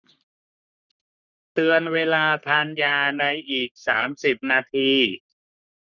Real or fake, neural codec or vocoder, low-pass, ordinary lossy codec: fake; codec, 44.1 kHz, 3.4 kbps, Pupu-Codec; 7.2 kHz; none